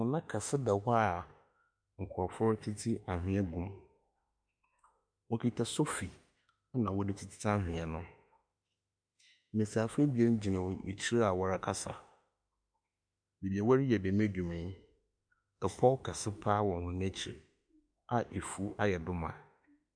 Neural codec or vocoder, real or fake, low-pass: autoencoder, 48 kHz, 32 numbers a frame, DAC-VAE, trained on Japanese speech; fake; 9.9 kHz